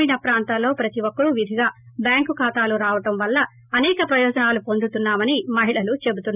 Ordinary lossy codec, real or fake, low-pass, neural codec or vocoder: none; real; 3.6 kHz; none